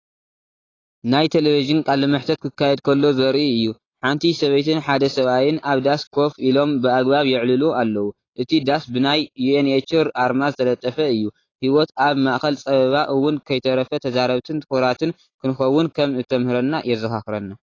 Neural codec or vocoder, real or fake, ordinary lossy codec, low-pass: none; real; AAC, 32 kbps; 7.2 kHz